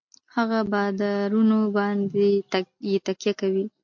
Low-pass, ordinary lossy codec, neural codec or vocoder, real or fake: 7.2 kHz; MP3, 48 kbps; none; real